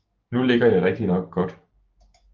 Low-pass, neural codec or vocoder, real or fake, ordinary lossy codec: 7.2 kHz; codec, 16 kHz, 6 kbps, DAC; fake; Opus, 32 kbps